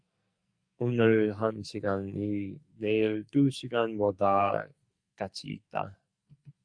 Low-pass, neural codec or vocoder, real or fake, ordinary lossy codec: 9.9 kHz; codec, 44.1 kHz, 2.6 kbps, SNAC; fake; Opus, 64 kbps